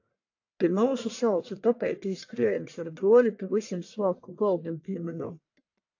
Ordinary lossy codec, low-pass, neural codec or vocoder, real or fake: AAC, 48 kbps; 7.2 kHz; codec, 44.1 kHz, 1.7 kbps, Pupu-Codec; fake